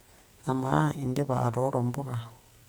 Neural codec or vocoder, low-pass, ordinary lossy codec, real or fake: codec, 44.1 kHz, 2.6 kbps, SNAC; none; none; fake